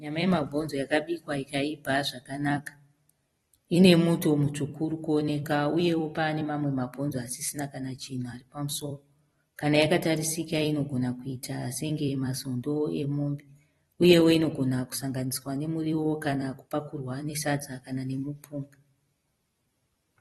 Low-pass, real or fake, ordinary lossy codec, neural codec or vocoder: 19.8 kHz; fake; AAC, 32 kbps; vocoder, 44.1 kHz, 128 mel bands every 256 samples, BigVGAN v2